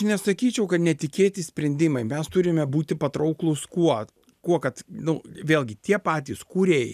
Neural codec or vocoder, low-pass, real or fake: none; 14.4 kHz; real